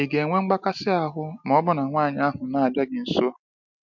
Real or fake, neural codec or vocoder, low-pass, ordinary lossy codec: real; none; 7.2 kHz; MP3, 64 kbps